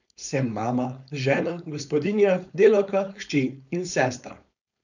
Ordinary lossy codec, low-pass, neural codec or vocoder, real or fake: none; 7.2 kHz; codec, 16 kHz, 4.8 kbps, FACodec; fake